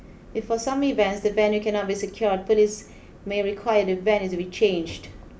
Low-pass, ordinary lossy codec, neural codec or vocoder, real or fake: none; none; none; real